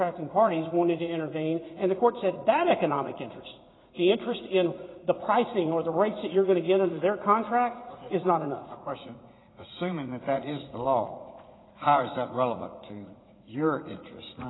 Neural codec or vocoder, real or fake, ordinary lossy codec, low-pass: vocoder, 44.1 kHz, 80 mel bands, Vocos; fake; AAC, 16 kbps; 7.2 kHz